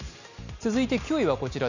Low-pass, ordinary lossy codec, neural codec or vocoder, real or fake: 7.2 kHz; none; none; real